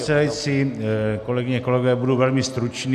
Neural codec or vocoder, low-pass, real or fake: none; 14.4 kHz; real